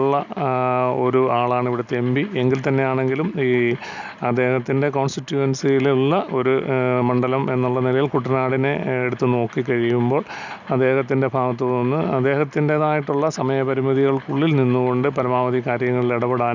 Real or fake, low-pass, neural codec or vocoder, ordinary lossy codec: real; 7.2 kHz; none; none